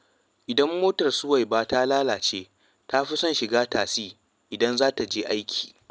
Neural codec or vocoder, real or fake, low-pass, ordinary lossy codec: none; real; none; none